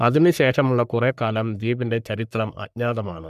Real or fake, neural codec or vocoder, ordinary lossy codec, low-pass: fake; codec, 44.1 kHz, 3.4 kbps, Pupu-Codec; none; 14.4 kHz